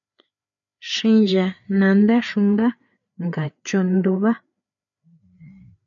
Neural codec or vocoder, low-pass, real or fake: codec, 16 kHz, 4 kbps, FreqCodec, larger model; 7.2 kHz; fake